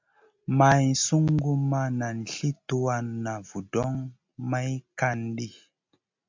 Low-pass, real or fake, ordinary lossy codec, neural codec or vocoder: 7.2 kHz; real; MP3, 64 kbps; none